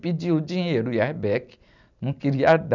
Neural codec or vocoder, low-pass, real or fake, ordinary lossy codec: none; 7.2 kHz; real; none